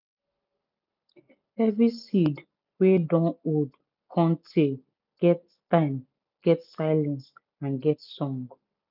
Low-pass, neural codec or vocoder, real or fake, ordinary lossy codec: 5.4 kHz; none; real; none